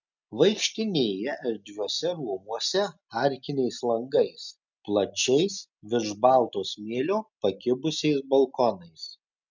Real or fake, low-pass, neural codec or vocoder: real; 7.2 kHz; none